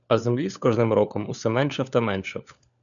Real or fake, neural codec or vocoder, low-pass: fake; codec, 16 kHz, 4 kbps, FunCodec, trained on LibriTTS, 50 frames a second; 7.2 kHz